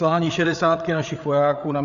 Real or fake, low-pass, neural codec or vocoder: fake; 7.2 kHz; codec, 16 kHz, 8 kbps, FreqCodec, larger model